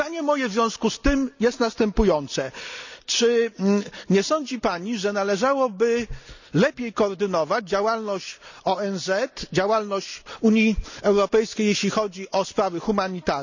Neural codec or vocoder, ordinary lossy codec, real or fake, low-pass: none; none; real; 7.2 kHz